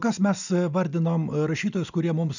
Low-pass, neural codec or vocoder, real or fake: 7.2 kHz; none; real